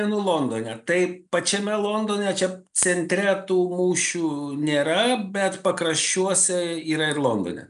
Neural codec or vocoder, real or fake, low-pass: none; real; 10.8 kHz